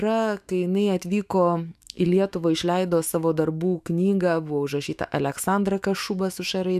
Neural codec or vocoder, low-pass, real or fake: autoencoder, 48 kHz, 128 numbers a frame, DAC-VAE, trained on Japanese speech; 14.4 kHz; fake